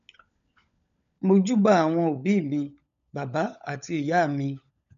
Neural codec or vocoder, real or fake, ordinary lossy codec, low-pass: codec, 16 kHz, 16 kbps, FunCodec, trained on LibriTTS, 50 frames a second; fake; MP3, 96 kbps; 7.2 kHz